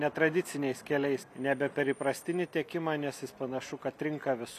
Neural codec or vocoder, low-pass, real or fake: none; 14.4 kHz; real